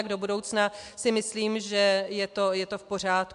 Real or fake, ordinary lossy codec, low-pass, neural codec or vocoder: real; MP3, 64 kbps; 10.8 kHz; none